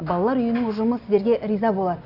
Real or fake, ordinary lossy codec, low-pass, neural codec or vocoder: real; none; 5.4 kHz; none